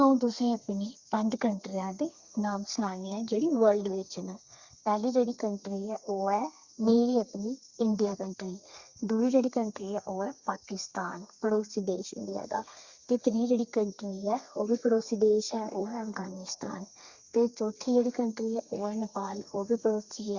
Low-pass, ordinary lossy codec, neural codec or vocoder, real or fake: 7.2 kHz; Opus, 64 kbps; codec, 32 kHz, 1.9 kbps, SNAC; fake